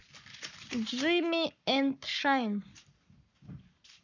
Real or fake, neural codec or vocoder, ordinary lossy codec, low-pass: fake; codec, 44.1 kHz, 7.8 kbps, Pupu-Codec; none; 7.2 kHz